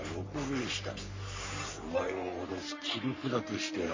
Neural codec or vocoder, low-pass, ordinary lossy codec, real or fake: codec, 44.1 kHz, 3.4 kbps, Pupu-Codec; 7.2 kHz; MP3, 32 kbps; fake